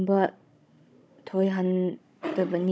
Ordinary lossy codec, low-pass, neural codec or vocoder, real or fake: none; none; codec, 16 kHz, 16 kbps, FunCodec, trained on Chinese and English, 50 frames a second; fake